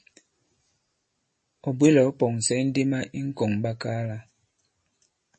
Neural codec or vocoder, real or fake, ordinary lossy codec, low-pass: none; real; MP3, 32 kbps; 10.8 kHz